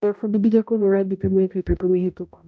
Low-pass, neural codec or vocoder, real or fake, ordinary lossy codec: none; codec, 16 kHz, 0.5 kbps, X-Codec, HuBERT features, trained on balanced general audio; fake; none